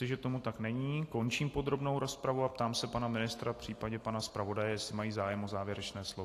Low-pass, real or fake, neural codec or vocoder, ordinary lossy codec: 14.4 kHz; real; none; AAC, 48 kbps